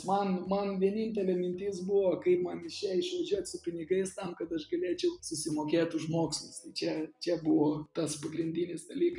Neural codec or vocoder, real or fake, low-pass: none; real; 10.8 kHz